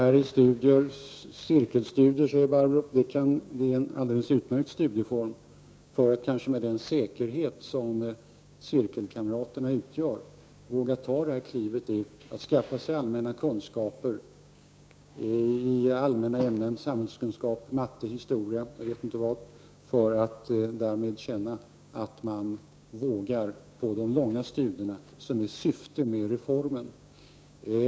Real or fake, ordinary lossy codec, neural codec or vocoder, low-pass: fake; none; codec, 16 kHz, 6 kbps, DAC; none